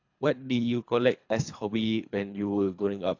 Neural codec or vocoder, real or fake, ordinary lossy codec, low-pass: codec, 24 kHz, 3 kbps, HILCodec; fake; AAC, 48 kbps; 7.2 kHz